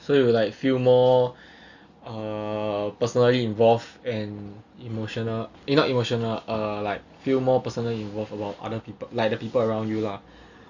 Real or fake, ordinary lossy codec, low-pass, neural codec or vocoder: real; Opus, 64 kbps; 7.2 kHz; none